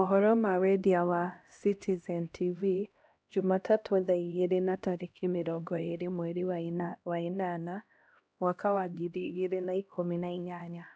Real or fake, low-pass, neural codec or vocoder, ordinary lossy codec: fake; none; codec, 16 kHz, 1 kbps, X-Codec, HuBERT features, trained on LibriSpeech; none